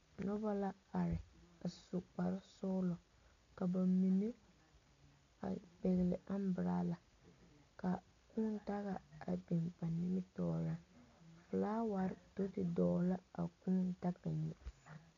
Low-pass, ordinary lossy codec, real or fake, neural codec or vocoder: 7.2 kHz; MP3, 64 kbps; real; none